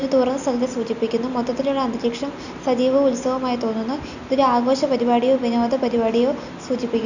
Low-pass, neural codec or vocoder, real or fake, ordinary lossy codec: 7.2 kHz; none; real; none